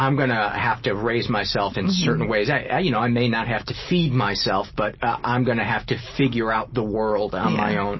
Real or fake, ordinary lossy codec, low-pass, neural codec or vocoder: real; MP3, 24 kbps; 7.2 kHz; none